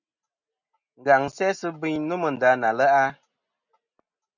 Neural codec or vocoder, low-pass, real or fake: none; 7.2 kHz; real